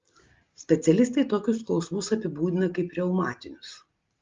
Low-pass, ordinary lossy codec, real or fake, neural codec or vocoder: 7.2 kHz; Opus, 32 kbps; real; none